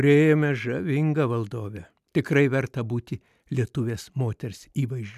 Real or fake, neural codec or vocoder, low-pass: real; none; 14.4 kHz